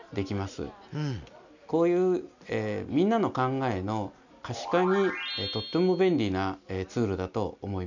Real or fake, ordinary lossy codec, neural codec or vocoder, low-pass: real; none; none; 7.2 kHz